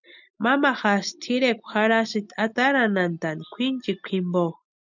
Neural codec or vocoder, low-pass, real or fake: none; 7.2 kHz; real